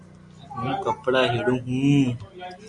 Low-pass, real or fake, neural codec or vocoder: 10.8 kHz; real; none